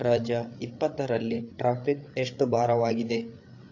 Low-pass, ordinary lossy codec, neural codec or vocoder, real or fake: 7.2 kHz; AAC, 48 kbps; codec, 16 kHz, 8 kbps, FreqCodec, larger model; fake